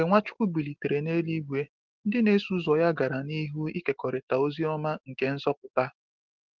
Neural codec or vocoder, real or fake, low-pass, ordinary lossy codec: none; real; 7.2 kHz; Opus, 16 kbps